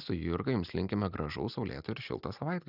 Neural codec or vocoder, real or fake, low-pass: none; real; 5.4 kHz